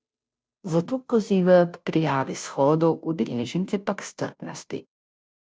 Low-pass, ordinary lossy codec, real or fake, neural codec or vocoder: none; none; fake; codec, 16 kHz, 0.5 kbps, FunCodec, trained on Chinese and English, 25 frames a second